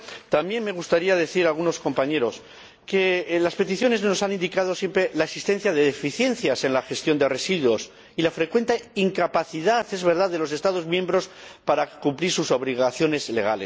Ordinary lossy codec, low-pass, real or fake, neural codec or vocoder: none; none; real; none